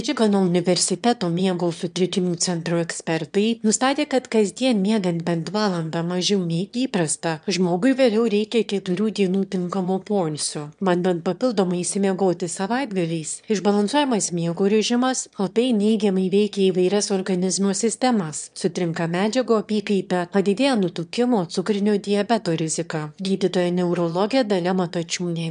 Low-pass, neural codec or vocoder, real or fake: 9.9 kHz; autoencoder, 22.05 kHz, a latent of 192 numbers a frame, VITS, trained on one speaker; fake